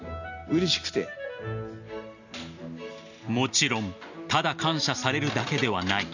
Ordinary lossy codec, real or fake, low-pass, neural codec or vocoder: none; real; 7.2 kHz; none